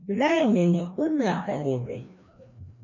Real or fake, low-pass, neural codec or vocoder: fake; 7.2 kHz; codec, 16 kHz, 1 kbps, FreqCodec, larger model